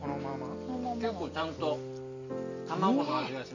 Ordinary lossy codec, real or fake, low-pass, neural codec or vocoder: none; real; 7.2 kHz; none